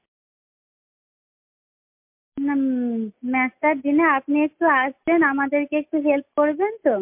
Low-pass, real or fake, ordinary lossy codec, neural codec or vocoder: 3.6 kHz; real; MP3, 32 kbps; none